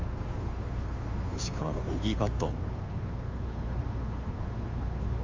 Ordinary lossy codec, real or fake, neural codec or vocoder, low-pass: Opus, 32 kbps; fake; codec, 16 kHz, 2 kbps, FunCodec, trained on Chinese and English, 25 frames a second; 7.2 kHz